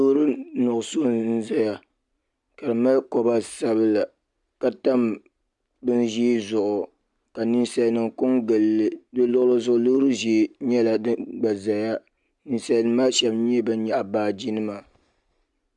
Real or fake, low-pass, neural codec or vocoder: real; 10.8 kHz; none